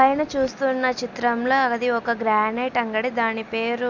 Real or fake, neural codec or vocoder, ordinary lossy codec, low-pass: real; none; none; 7.2 kHz